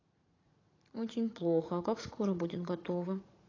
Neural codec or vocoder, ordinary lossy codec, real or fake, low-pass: vocoder, 44.1 kHz, 80 mel bands, Vocos; AAC, 32 kbps; fake; 7.2 kHz